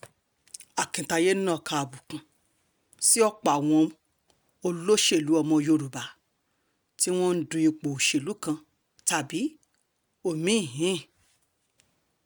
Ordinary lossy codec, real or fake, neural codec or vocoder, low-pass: none; real; none; none